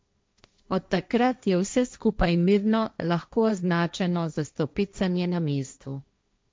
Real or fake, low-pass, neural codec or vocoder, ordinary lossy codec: fake; none; codec, 16 kHz, 1.1 kbps, Voila-Tokenizer; none